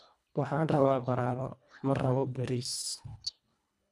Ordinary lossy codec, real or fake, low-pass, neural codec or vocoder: none; fake; none; codec, 24 kHz, 1.5 kbps, HILCodec